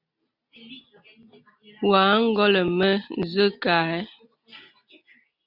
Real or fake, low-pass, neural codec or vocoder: real; 5.4 kHz; none